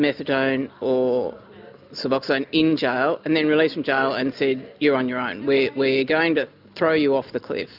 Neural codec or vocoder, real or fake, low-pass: none; real; 5.4 kHz